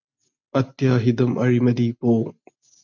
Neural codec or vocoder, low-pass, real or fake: none; 7.2 kHz; real